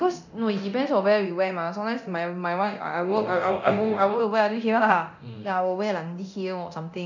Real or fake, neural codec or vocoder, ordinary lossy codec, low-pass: fake; codec, 24 kHz, 0.9 kbps, DualCodec; none; 7.2 kHz